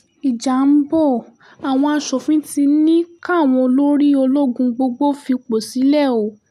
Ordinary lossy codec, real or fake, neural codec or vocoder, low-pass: none; real; none; 14.4 kHz